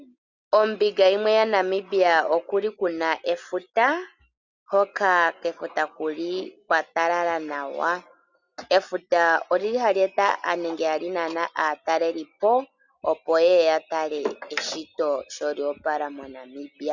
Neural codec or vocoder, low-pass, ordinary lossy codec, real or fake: none; 7.2 kHz; Opus, 64 kbps; real